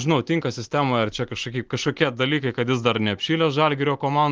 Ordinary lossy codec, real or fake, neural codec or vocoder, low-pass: Opus, 24 kbps; real; none; 7.2 kHz